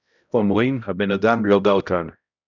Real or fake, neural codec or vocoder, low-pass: fake; codec, 16 kHz, 0.5 kbps, X-Codec, HuBERT features, trained on balanced general audio; 7.2 kHz